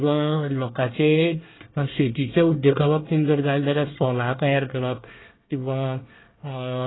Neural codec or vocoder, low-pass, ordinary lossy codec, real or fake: codec, 24 kHz, 1 kbps, SNAC; 7.2 kHz; AAC, 16 kbps; fake